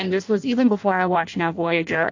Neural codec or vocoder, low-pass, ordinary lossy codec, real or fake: codec, 16 kHz in and 24 kHz out, 0.6 kbps, FireRedTTS-2 codec; 7.2 kHz; AAC, 48 kbps; fake